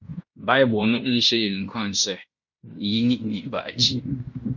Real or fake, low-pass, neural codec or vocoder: fake; 7.2 kHz; codec, 16 kHz in and 24 kHz out, 0.9 kbps, LongCat-Audio-Codec, fine tuned four codebook decoder